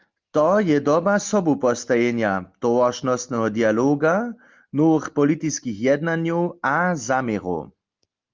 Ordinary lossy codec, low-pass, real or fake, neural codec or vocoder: Opus, 24 kbps; 7.2 kHz; real; none